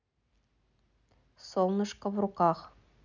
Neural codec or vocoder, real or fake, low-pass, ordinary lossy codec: none; real; 7.2 kHz; none